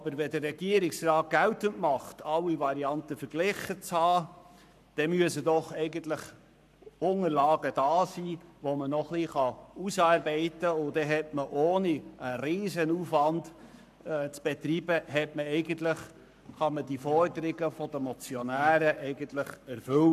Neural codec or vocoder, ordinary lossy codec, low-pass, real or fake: codec, 44.1 kHz, 7.8 kbps, Pupu-Codec; none; 14.4 kHz; fake